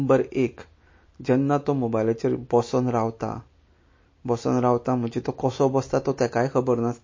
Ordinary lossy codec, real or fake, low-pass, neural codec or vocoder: MP3, 32 kbps; real; 7.2 kHz; none